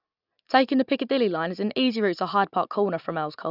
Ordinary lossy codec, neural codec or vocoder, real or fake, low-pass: none; none; real; 5.4 kHz